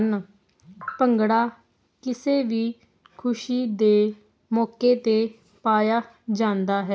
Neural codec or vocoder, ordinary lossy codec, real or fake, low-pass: none; none; real; none